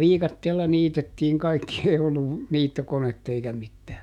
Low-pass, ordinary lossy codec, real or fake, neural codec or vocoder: 19.8 kHz; none; fake; autoencoder, 48 kHz, 128 numbers a frame, DAC-VAE, trained on Japanese speech